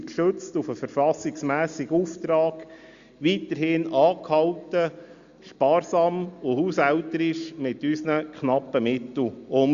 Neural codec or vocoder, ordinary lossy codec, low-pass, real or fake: none; Opus, 64 kbps; 7.2 kHz; real